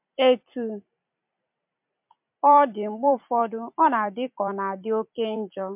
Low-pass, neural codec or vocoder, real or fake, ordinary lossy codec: 3.6 kHz; vocoder, 44.1 kHz, 128 mel bands every 256 samples, BigVGAN v2; fake; MP3, 32 kbps